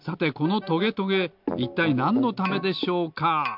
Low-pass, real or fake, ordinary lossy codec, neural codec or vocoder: 5.4 kHz; real; MP3, 48 kbps; none